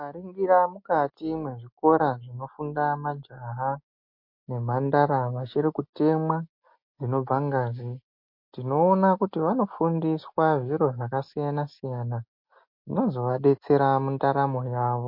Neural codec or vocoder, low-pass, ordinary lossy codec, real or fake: none; 5.4 kHz; MP3, 32 kbps; real